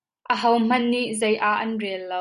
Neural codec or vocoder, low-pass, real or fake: none; 10.8 kHz; real